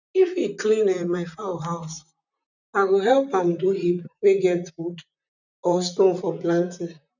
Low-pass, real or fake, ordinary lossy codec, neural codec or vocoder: 7.2 kHz; fake; none; vocoder, 44.1 kHz, 128 mel bands, Pupu-Vocoder